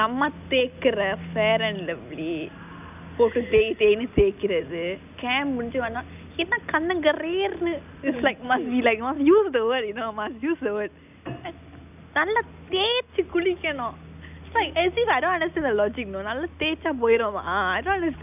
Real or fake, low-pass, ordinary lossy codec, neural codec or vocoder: real; 3.6 kHz; none; none